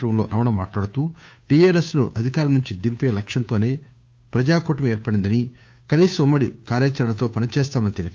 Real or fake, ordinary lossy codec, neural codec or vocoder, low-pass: fake; none; codec, 16 kHz, 2 kbps, FunCodec, trained on Chinese and English, 25 frames a second; none